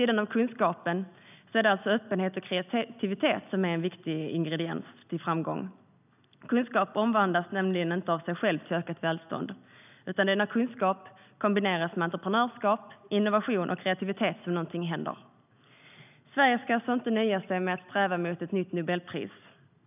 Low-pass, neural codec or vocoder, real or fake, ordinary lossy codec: 3.6 kHz; none; real; none